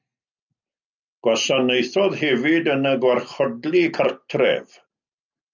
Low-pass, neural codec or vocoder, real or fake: 7.2 kHz; none; real